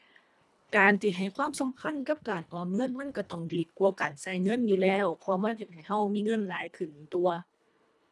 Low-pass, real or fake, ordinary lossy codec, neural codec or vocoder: none; fake; none; codec, 24 kHz, 1.5 kbps, HILCodec